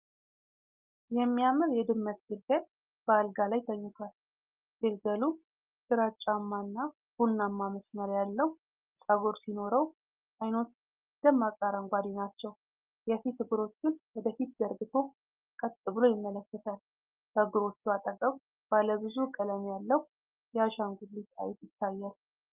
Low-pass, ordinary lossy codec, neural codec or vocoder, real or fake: 3.6 kHz; Opus, 24 kbps; none; real